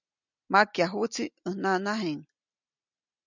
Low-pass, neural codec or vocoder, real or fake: 7.2 kHz; none; real